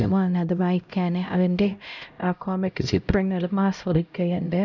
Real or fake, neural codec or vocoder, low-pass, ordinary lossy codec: fake; codec, 16 kHz, 0.5 kbps, X-Codec, HuBERT features, trained on LibriSpeech; 7.2 kHz; none